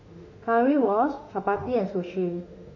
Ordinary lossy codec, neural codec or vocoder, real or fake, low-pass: none; autoencoder, 48 kHz, 32 numbers a frame, DAC-VAE, trained on Japanese speech; fake; 7.2 kHz